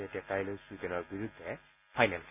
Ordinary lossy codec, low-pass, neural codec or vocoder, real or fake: none; 3.6 kHz; none; real